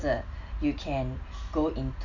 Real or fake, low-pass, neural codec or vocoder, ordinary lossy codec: real; 7.2 kHz; none; none